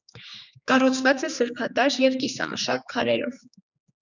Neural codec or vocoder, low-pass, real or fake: codec, 16 kHz, 2 kbps, X-Codec, HuBERT features, trained on general audio; 7.2 kHz; fake